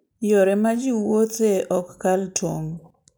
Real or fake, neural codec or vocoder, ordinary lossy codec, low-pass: real; none; none; none